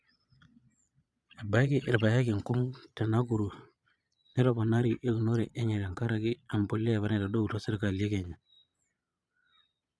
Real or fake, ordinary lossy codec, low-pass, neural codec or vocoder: fake; none; none; vocoder, 22.05 kHz, 80 mel bands, Vocos